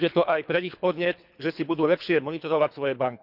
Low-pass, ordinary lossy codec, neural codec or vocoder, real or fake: 5.4 kHz; none; codec, 24 kHz, 3 kbps, HILCodec; fake